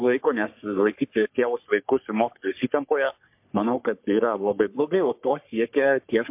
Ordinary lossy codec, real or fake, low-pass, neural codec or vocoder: MP3, 32 kbps; fake; 3.6 kHz; codec, 44.1 kHz, 3.4 kbps, Pupu-Codec